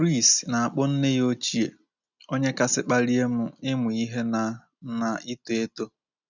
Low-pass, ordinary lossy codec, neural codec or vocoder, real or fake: 7.2 kHz; none; none; real